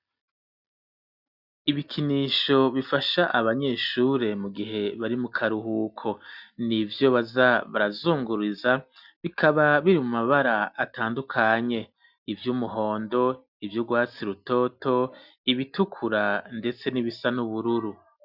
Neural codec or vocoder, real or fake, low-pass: none; real; 5.4 kHz